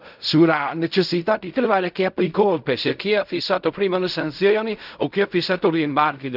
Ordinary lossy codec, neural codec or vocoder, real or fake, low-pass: none; codec, 16 kHz in and 24 kHz out, 0.4 kbps, LongCat-Audio-Codec, fine tuned four codebook decoder; fake; 5.4 kHz